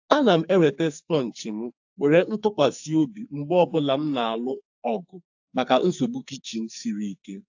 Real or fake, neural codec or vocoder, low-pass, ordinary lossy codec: fake; codec, 44.1 kHz, 2.6 kbps, SNAC; 7.2 kHz; AAC, 48 kbps